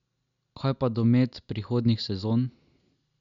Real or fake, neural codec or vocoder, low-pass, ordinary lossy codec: real; none; 7.2 kHz; MP3, 96 kbps